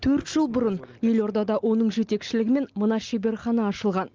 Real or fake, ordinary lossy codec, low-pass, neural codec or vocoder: real; Opus, 24 kbps; 7.2 kHz; none